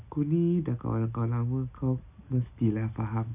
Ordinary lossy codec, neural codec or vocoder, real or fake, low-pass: none; none; real; 3.6 kHz